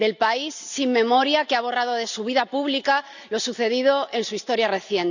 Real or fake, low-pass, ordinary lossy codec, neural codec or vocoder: real; 7.2 kHz; none; none